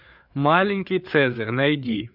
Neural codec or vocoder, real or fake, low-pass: codec, 16 kHz, 4 kbps, FreqCodec, larger model; fake; 5.4 kHz